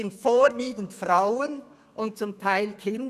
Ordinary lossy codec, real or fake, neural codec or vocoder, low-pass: none; fake; codec, 32 kHz, 1.9 kbps, SNAC; 14.4 kHz